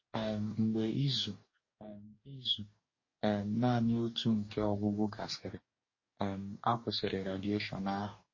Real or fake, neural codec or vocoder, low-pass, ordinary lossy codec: fake; codec, 44.1 kHz, 2.6 kbps, DAC; 7.2 kHz; MP3, 32 kbps